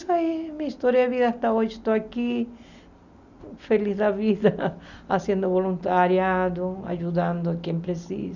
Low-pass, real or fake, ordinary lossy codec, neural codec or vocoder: 7.2 kHz; real; none; none